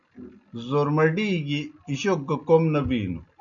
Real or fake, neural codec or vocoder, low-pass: real; none; 7.2 kHz